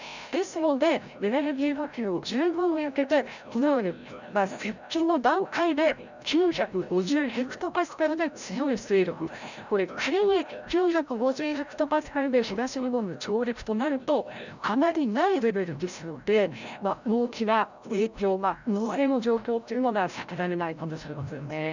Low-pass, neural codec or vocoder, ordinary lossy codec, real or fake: 7.2 kHz; codec, 16 kHz, 0.5 kbps, FreqCodec, larger model; none; fake